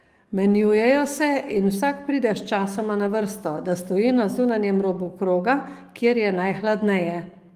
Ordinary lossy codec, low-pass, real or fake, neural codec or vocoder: Opus, 32 kbps; 14.4 kHz; fake; codec, 44.1 kHz, 7.8 kbps, DAC